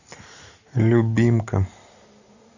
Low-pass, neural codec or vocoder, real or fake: 7.2 kHz; none; real